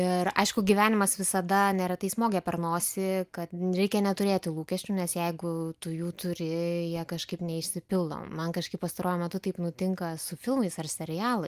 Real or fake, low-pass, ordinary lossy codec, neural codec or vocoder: real; 14.4 kHz; Opus, 32 kbps; none